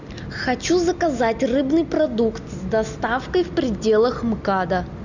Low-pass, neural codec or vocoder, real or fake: 7.2 kHz; none; real